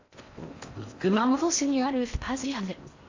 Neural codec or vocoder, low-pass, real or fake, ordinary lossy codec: codec, 16 kHz in and 24 kHz out, 0.6 kbps, FocalCodec, streaming, 4096 codes; 7.2 kHz; fake; AAC, 48 kbps